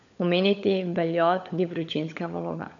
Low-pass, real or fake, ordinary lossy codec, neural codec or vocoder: 7.2 kHz; fake; none; codec, 16 kHz, 16 kbps, FunCodec, trained on LibriTTS, 50 frames a second